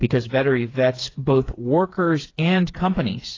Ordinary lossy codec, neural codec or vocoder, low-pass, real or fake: AAC, 32 kbps; codec, 16 kHz, 4 kbps, FreqCodec, smaller model; 7.2 kHz; fake